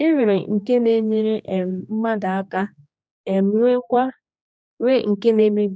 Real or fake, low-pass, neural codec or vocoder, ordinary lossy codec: fake; none; codec, 16 kHz, 2 kbps, X-Codec, HuBERT features, trained on general audio; none